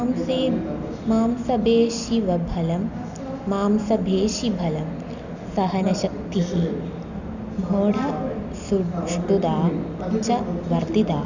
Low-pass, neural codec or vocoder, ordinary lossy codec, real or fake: 7.2 kHz; none; none; real